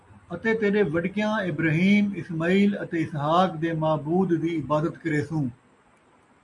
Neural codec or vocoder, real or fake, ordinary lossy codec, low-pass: none; real; MP3, 48 kbps; 10.8 kHz